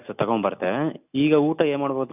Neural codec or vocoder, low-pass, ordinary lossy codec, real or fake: none; 3.6 kHz; none; real